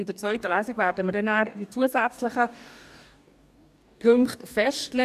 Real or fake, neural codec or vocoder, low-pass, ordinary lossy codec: fake; codec, 44.1 kHz, 2.6 kbps, DAC; 14.4 kHz; none